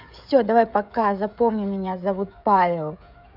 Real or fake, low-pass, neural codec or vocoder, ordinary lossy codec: fake; 5.4 kHz; codec, 16 kHz, 16 kbps, FreqCodec, smaller model; none